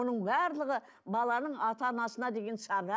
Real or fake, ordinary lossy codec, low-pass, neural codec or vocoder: real; none; none; none